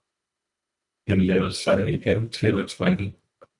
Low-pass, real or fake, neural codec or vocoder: 10.8 kHz; fake; codec, 24 kHz, 1.5 kbps, HILCodec